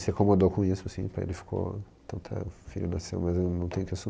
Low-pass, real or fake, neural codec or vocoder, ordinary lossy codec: none; real; none; none